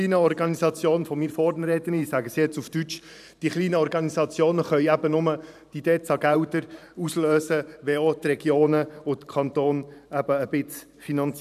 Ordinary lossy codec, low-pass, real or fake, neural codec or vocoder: none; 14.4 kHz; fake; vocoder, 44.1 kHz, 128 mel bands every 512 samples, BigVGAN v2